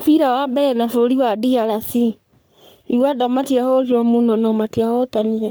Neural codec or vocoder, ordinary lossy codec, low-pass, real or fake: codec, 44.1 kHz, 3.4 kbps, Pupu-Codec; none; none; fake